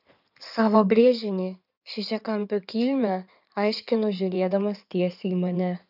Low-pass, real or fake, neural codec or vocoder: 5.4 kHz; fake; codec, 16 kHz in and 24 kHz out, 2.2 kbps, FireRedTTS-2 codec